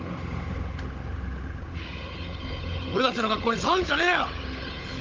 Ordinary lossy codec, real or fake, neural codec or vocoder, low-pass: Opus, 32 kbps; fake; codec, 16 kHz, 16 kbps, FunCodec, trained on Chinese and English, 50 frames a second; 7.2 kHz